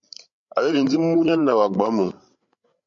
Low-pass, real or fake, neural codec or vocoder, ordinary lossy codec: 7.2 kHz; fake; codec, 16 kHz, 8 kbps, FreqCodec, larger model; MP3, 48 kbps